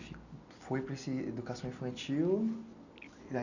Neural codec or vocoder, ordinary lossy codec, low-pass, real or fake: none; none; 7.2 kHz; real